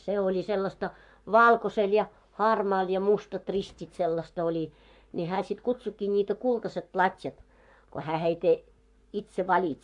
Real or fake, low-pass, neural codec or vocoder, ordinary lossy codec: fake; 10.8 kHz; codec, 44.1 kHz, 7.8 kbps, Pupu-Codec; AAC, 64 kbps